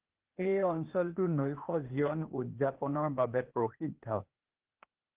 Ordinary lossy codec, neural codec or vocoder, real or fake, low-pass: Opus, 16 kbps; codec, 16 kHz, 0.8 kbps, ZipCodec; fake; 3.6 kHz